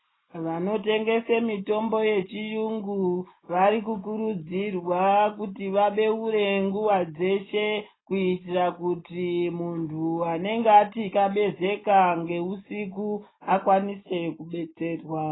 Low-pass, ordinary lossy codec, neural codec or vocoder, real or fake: 7.2 kHz; AAC, 16 kbps; none; real